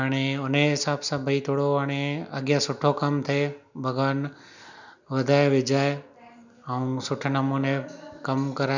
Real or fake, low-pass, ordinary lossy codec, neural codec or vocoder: real; 7.2 kHz; none; none